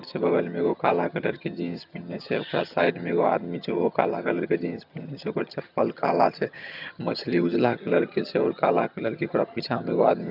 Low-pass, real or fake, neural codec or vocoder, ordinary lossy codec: 5.4 kHz; fake; vocoder, 22.05 kHz, 80 mel bands, HiFi-GAN; none